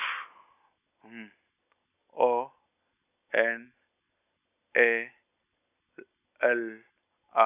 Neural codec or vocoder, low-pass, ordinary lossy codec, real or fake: none; 3.6 kHz; none; real